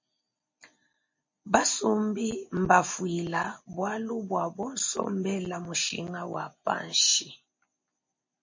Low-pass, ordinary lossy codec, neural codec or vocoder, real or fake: 7.2 kHz; MP3, 32 kbps; none; real